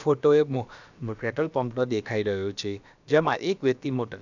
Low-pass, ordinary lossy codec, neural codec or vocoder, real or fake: 7.2 kHz; none; codec, 16 kHz, about 1 kbps, DyCAST, with the encoder's durations; fake